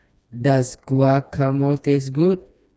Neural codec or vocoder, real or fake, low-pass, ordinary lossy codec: codec, 16 kHz, 2 kbps, FreqCodec, smaller model; fake; none; none